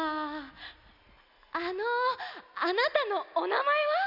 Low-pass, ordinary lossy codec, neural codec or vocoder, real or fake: 5.4 kHz; none; none; real